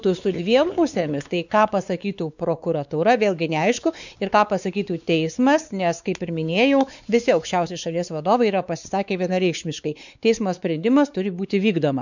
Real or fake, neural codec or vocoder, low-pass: fake; codec, 16 kHz, 4 kbps, X-Codec, WavLM features, trained on Multilingual LibriSpeech; 7.2 kHz